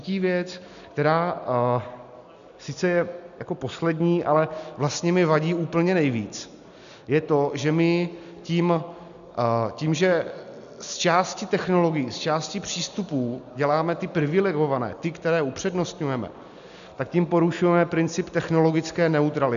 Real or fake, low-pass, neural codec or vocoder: real; 7.2 kHz; none